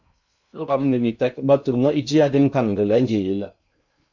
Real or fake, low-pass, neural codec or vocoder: fake; 7.2 kHz; codec, 16 kHz in and 24 kHz out, 0.6 kbps, FocalCodec, streaming, 2048 codes